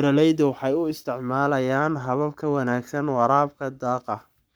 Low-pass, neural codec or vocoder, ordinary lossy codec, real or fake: none; codec, 44.1 kHz, 7.8 kbps, Pupu-Codec; none; fake